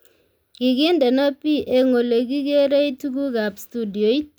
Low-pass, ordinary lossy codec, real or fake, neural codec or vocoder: none; none; real; none